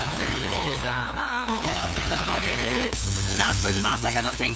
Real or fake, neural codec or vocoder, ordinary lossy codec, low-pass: fake; codec, 16 kHz, 2 kbps, FunCodec, trained on LibriTTS, 25 frames a second; none; none